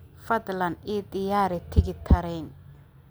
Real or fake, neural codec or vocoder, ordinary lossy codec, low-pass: real; none; none; none